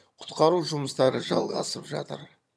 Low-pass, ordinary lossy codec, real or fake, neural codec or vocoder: none; none; fake; vocoder, 22.05 kHz, 80 mel bands, HiFi-GAN